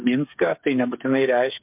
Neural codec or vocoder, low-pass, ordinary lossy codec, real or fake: codec, 16 kHz, 8 kbps, FreqCodec, smaller model; 3.6 kHz; MP3, 32 kbps; fake